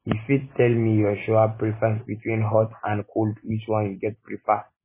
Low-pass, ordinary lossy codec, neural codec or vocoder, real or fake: 3.6 kHz; MP3, 16 kbps; none; real